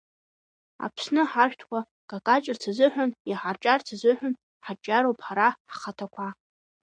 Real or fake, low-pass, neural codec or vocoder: real; 9.9 kHz; none